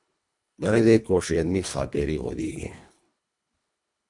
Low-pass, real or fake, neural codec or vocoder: 10.8 kHz; fake; codec, 24 kHz, 1.5 kbps, HILCodec